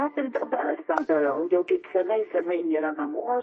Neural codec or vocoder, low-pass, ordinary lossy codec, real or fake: codec, 24 kHz, 0.9 kbps, WavTokenizer, medium music audio release; 10.8 kHz; MP3, 32 kbps; fake